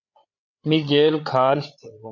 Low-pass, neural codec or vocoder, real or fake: 7.2 kHz; codec, 16 kHz, 8 kbps, FreqCodec, larger model; fake